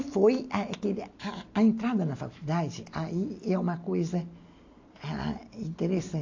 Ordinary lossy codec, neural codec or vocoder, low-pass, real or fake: AAC, 48 kbps; none; 7.2 kHz; real